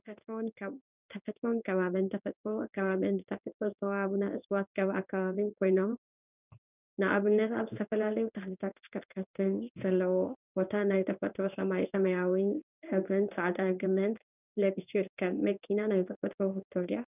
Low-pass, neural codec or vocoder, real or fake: 3.6 kHz; codec, 16 kHz in and 24 kHz out, 1 kbps, XY-Tokenizer; fake